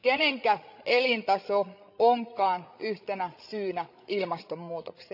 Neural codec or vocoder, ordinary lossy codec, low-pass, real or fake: codec, 16 kHz, 16 kbps, FreqCodec, larger model; none; 5.4 kHz; fake